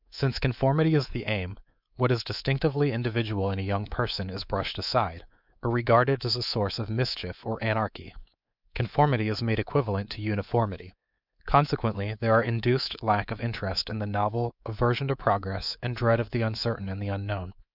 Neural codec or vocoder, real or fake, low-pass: codec, 24 kHz, 3.1 kbps, DualCodec; fake; 5.4 kHz